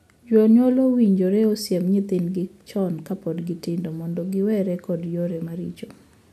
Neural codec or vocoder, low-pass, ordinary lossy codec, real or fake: none; 14.4 kHz; none; real